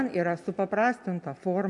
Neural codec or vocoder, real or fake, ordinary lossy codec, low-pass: none; real; MP3, 64 kbps; 10.8 kHz